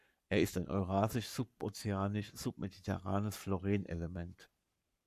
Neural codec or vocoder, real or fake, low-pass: codec, 44.1 kHz, 7.8 kbps, Pupu-Codec; fake; 14.4 kHz